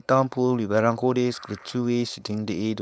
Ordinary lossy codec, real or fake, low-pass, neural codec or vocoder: none; fake; none; codec, 16 kHz, 4.8 kbps, FACodec